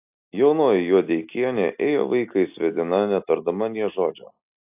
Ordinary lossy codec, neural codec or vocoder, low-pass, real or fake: AAC, 32 kbps; none; 3.6 kHz; real